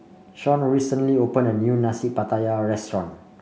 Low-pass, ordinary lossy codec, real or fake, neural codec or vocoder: none; none; real; none